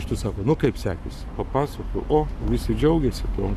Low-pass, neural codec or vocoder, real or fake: 14.4 kHz; codec, 44.1 kHz, 7.8 kbps, Pupu-Codec; fake